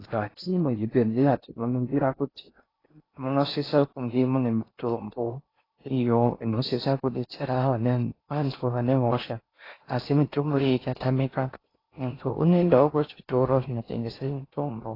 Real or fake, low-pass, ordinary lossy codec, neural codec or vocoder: fake; 5.4 kHz; AAC, 24 kbps; codec, 16 kHz in and 24 kHz out, 0.6 kbps, FocalCodec, streaming, 4096 codes